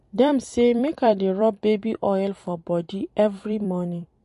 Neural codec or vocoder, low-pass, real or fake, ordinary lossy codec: vocoder, 44.1 kHz, 128 mel bands every 256 samples, BigVGAN v2; 14.4 kHz; fake; MP3, 48 kbps